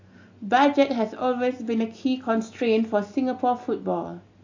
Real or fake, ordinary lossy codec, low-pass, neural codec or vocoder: real; AAC, 48 kbps; 7.2 kHz; none